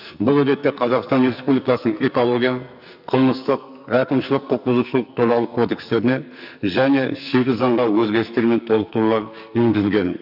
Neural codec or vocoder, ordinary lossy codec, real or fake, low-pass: codec, 44.1 kHz, 2.6 kbps, SNAC; AAC, 48 kbps; fake; 5.4 kHz